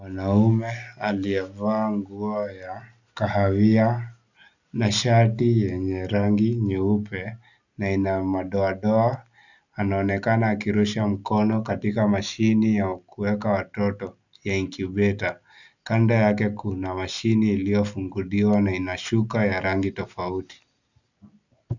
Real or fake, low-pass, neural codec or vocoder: real; 7.2 kHz; none